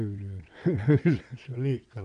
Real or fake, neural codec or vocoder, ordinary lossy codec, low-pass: fake; vocoder, 22.05 kHz, 80 mel bands, WaveNeXt; none; 9.9 kHz